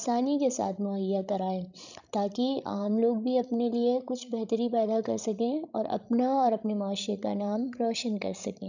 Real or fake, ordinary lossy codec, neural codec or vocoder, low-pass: fake; none; codec, 16 kHz, 16 kbps, FreqCodec, larger model; 7.2 kHz